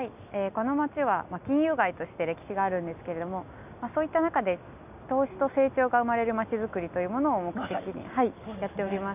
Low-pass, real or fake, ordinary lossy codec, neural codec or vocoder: 3.6 kHz; real; none; none